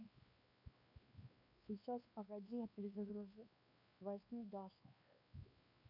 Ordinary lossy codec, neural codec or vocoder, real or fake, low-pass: MP3, 32 kbps; codec, 16 kHz, 2 kbps, X-Codec, WavLM features, trained on Multilingual LibriSpeech; fake; 5.4 kHz